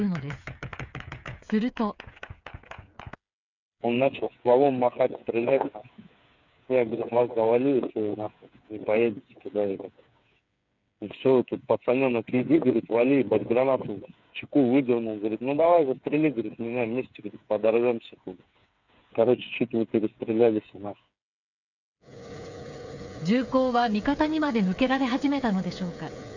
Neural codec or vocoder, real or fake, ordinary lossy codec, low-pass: codec, 16 kHz, 8 kbps, FreqCodec, smaller model; fake; none; 7.2 kHz